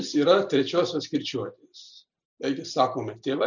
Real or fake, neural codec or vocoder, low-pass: real; none; 7.2 kHz